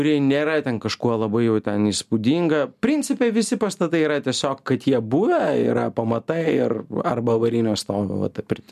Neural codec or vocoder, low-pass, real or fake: none; 14.4 kHz; real